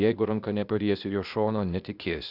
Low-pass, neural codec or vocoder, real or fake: 5.4 kHz; codec, 16 kHz, 0.8 kbps, ZipCodec; fake